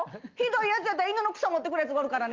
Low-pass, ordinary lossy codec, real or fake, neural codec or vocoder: 7.2 kHz; Opus, 24 kbps; real; none